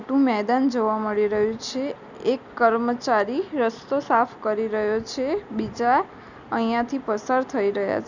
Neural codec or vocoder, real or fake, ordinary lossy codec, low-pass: none; real; none; 7.2 kHz